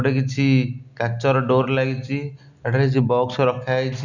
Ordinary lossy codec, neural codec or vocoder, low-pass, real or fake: none; none; 7.2 kHz; real